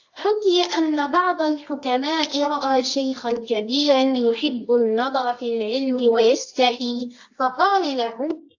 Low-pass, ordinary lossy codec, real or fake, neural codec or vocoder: 7.2 kHz; AAC, 48 kbps; fake; codec, 24 kHz, 0.9 kbps, WavTokenizer, medium music audio release